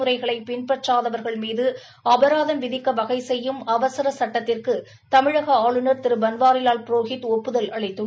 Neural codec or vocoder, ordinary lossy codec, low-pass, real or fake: none; none; none; real